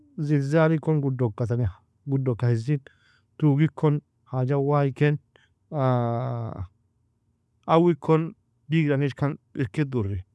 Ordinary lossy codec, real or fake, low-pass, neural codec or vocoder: none; real; none; none